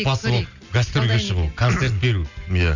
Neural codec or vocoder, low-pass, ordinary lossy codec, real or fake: none; 7.2 kHz; none; real